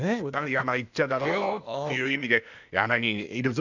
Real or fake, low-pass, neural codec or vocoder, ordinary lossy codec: fake; 7.2 kHz; codec, 16 kHz, 0.8 kbps, ZipCodec; none